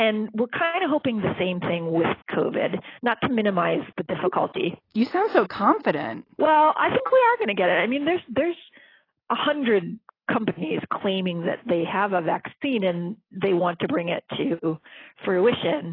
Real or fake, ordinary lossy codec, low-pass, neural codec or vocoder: real; AAC, 24 kbps; 5.4 kHz; none